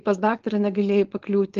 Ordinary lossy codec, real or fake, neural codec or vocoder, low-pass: Opus, 16 kbps; fake; codec, 16 kHz, 4.8 kbps, FACodec; 7.2 kHz